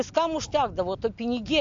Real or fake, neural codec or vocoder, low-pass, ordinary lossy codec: real; none; 7.2 kHz; AAC, 64 kbps